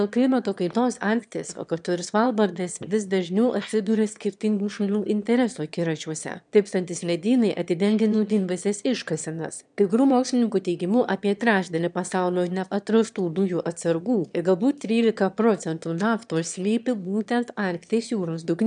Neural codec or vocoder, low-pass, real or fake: autoencoder, 22.05 kHz, a latent of 192 numbers a frame, VITS, trained on one speaker; 9.9 kHz; fake